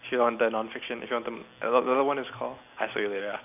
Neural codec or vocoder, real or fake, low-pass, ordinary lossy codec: vocoder, 44.1 kHz, 128 mel bands every 256 samples, BigVGAN v2; fake; 3.6 kHz; none